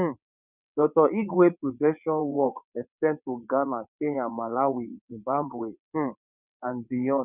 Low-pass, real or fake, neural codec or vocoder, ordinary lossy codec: 3.6 kHz; fake; vocoder, 22.05 kHz, 80 mel bands, WaveNeXt; none